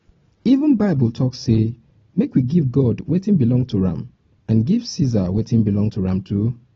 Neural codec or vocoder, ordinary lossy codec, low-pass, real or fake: none; AAC, 24 kbps; 7.2 kHz; real